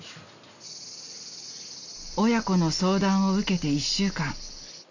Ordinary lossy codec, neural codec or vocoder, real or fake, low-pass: none; none; real; 7.2 kHz